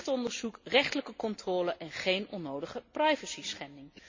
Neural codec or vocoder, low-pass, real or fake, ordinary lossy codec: none; 7.2 kHz; real; MP3, 32 kbps